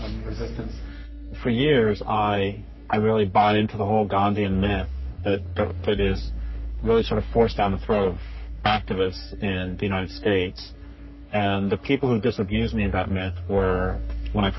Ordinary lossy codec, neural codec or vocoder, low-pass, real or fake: MP3, 24 kbps; codec, 44.1 kHz, 3.4 kbps, Pupu-Codec; 7.2 kHz; fake